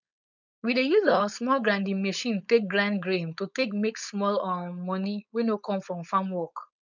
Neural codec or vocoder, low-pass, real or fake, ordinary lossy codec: codec, 16 kHz, 4.8 kbps, FACodec; 7.2 kHz; fake; none